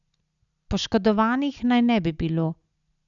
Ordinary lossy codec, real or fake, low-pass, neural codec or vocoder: none; real; 7.2 kHz; none